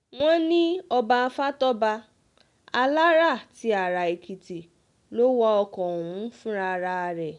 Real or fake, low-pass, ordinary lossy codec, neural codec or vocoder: real; 10.8 kHz; none; none